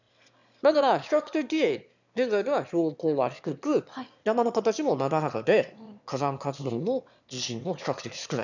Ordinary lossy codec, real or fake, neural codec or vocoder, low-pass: none; fake; autoencoder, 22.05 kHz, a latent of 192 numbers a frame, VITS, trained on one speaker; 7.2 kHz